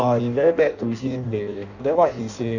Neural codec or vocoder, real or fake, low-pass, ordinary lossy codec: codec, 16 kHz in and 24 kHz out, 0.6 kbps, FireRedTTS-2 codec; fake; 7.2 kHz; none